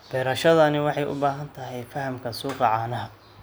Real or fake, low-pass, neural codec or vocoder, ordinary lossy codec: real; none; none; none